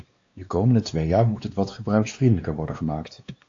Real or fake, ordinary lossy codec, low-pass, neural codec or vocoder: fake; AAC, 48 kbps; 7.2 kHz; codec, 16 kHz, 2 kbps, X-Codec, WavLM features, trained on Multilingual LibriSpeech